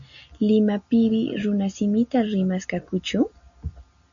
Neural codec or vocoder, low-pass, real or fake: none; 7.2 kHz; real